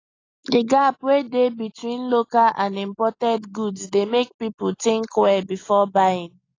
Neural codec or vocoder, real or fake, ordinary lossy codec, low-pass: none; real; AAC, 32 kbps; 7.2 kHz